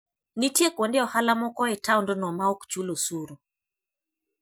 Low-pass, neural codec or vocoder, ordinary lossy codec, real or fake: none; none; none; real